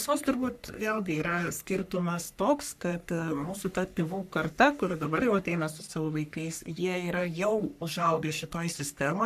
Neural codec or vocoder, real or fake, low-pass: codec, 44.1 kHz, 3.4 kbps, Pupu-Codec; fake; 14.4 kHz